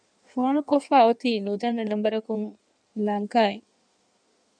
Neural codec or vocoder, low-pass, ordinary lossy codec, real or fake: codec, 16 kHz in and 24 kHz out, 1.1 kbps, FireRedTTS-2 codec; 9.9 kHz; MP3, 96 kbps; fake